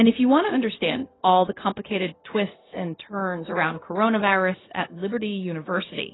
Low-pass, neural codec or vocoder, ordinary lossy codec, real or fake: 7.2 kHz; codec, 16 kHz, 0.4 kbps, LongCat-Audio-Codec; AAC, 16 kbps; fake